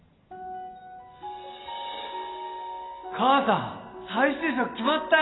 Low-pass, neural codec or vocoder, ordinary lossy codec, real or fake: 7.2 kHz; none; AAC, 16 kbps; real